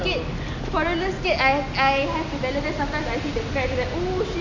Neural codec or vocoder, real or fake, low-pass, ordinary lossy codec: none; real; 7.2 kHz; none